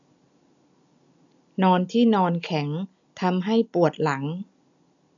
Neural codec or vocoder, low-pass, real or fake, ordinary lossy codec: none; 7.2 kHz; real; none